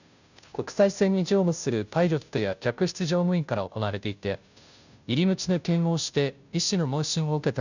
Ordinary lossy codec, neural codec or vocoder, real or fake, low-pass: none; codec, 16 kHz, 0.5 kbps, FunCodec, trained on Chinese and English, 25 frames a second; fake; 7.2 kHz